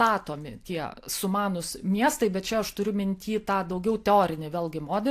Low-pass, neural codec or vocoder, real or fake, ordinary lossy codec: 14.4 kHz; none; real; AAC, 64 kbps